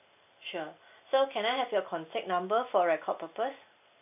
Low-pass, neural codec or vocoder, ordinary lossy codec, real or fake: 3.6 kHz; none; none; real